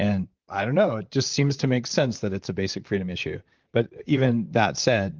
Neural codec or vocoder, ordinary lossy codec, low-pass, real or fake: vocoder, 44.1 kHz, 128 mel bands, Pupu-Vocoder; Opus, 32 kbps; 7.2 kHz; fake